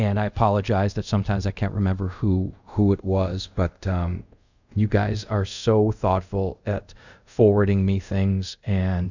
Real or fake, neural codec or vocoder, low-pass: fake; codec, 24 kHz, 0.5 kbps, DualCodec; 7.2 kHz